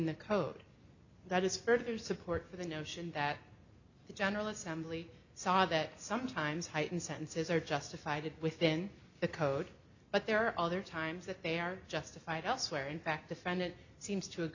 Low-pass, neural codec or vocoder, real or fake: 7.2 kHz; none; real